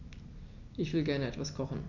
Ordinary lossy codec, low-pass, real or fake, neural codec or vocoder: none; 7.2 kHz; real; none